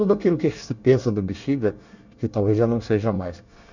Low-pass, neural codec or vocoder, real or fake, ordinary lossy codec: 7.2 kHz; codec, 24 kHz, 1 kbps, SNAC; fake; none